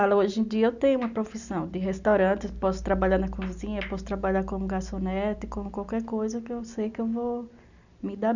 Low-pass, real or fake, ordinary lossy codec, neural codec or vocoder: 7.2 kHz; real; none; none